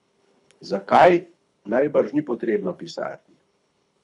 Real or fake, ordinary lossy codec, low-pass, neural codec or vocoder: fake; none; 10.8 kHz; codec, 24 kHz, 3 kbps, HILCodec